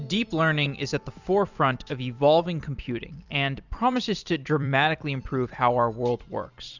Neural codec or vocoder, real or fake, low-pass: vocoder, 44.1 kHz, 128 mel bands every 256 samples, BigVGAN v2; fake; 7.2 kHz